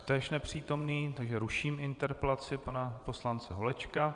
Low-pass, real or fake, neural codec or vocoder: 9.9 kHz; fake; vocoder, 22.05 kHz, 80 mel bands, WaveNeXt